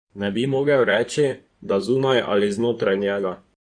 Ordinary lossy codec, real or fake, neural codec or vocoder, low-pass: none; fake; codec, 16 kHz in and 24 kHz out, 2.2 kbps, FireRedTTS-2 codec; 9.9 kHz